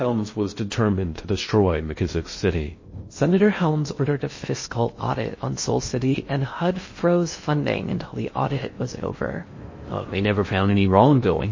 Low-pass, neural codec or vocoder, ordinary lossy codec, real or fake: 7.2 kHz; codec, 16 kHz in and 24 kHz out, 0.6 kbps, FocalCodec, streaming, 2048 codes; MP3, 32 kbps; fake